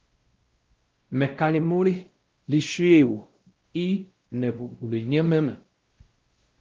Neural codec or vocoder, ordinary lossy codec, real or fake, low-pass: codec, 16 kHz, 0.5 kbps, X-Codec, WavLM features, trained on Multilingual LibriSpeech; Opus, 16 kbps; fake; 7.2 kHz